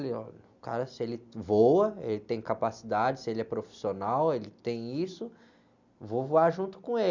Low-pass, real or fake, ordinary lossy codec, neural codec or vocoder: 7.2 kHz; real; Opus, 64 kbps; none